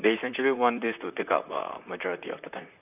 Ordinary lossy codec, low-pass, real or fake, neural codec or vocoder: none; 3.6 kHz; fake; vocoder, 44.1 kHz, 128 mel bands, Pupu-Vocoder